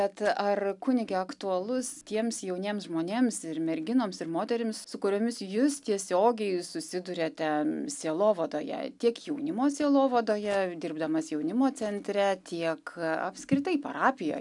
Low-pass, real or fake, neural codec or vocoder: 10.8 kHz; real; none